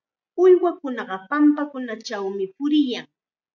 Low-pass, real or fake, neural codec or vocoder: 7.2 kHz; real; none